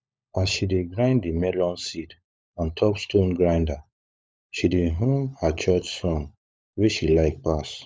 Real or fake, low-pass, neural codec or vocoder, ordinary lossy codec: fake; none; codec, 16 kHz, 16 kbps, FunCodec, trained on LibriTTS, 50 frames a second; none